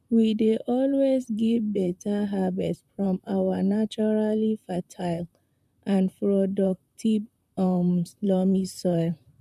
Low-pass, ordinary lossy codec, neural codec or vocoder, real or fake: 14.4 kHz; none; vocoder, 44.1 kHz, 128 mel bands, Pupu-Vocoder; fake